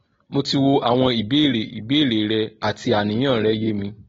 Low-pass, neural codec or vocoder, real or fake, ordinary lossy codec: 7.2 kHz; none; real; AAC, 24 kbps